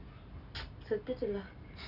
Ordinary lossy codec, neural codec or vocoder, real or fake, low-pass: none; none; real; 5.4 kHz